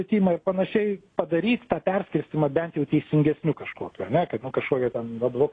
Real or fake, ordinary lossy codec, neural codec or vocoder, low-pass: real; AAC, 32 kbps; none; 9.9 kHz